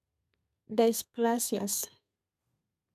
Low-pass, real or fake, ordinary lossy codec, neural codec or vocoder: 14.4 kHz; fake; none; codec, 32 kHz, 1.9 kbps, SNAC